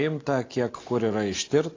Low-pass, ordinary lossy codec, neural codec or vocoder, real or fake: 7.2 kHz; AAC, 32 kbps; none; real